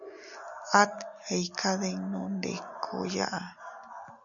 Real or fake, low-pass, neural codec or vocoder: real; 7.2 kHz; none